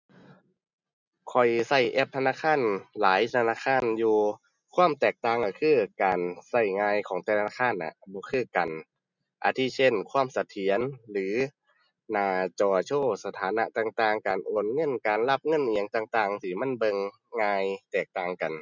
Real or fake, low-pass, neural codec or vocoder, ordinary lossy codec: real; 7.2 kHz; none; none